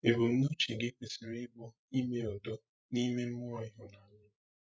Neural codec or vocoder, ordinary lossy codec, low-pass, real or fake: codec, 16 kHz, 16 kbps, FreqCodec, larger model; none; none; fake